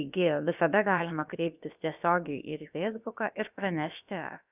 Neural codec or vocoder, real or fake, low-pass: codec, 16 kHz, about 1 kbps, DyCAST, with the encoder's durations; fake; 3.6 kHz